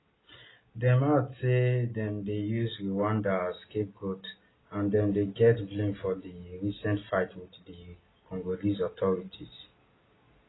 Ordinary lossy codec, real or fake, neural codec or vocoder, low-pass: AAC, 16 kbps; real; none; 7.2 kHz